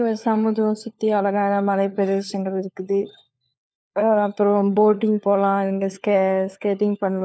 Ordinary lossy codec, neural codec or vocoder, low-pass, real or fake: none; codec, 16 kHz, 4 kbps, FunCodec, trained on LibriTTS, 50 frames a second; none; fake